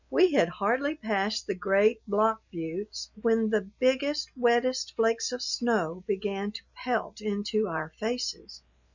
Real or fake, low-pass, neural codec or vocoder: real; 7.2 kHz; none